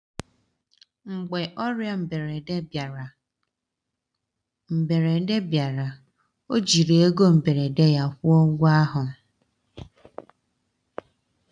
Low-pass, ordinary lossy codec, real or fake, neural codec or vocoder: 9.9 kHz; none; real; none